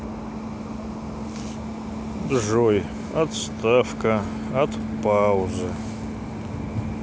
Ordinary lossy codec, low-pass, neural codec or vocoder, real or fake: none; none; none; real